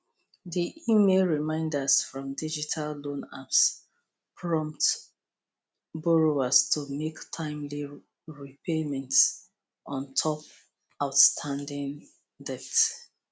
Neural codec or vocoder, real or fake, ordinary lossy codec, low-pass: none; real; none; none